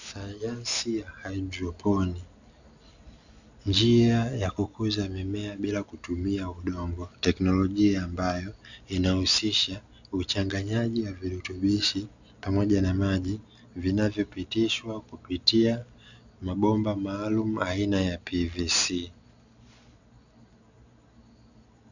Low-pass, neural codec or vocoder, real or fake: 7.2 kHz; none; real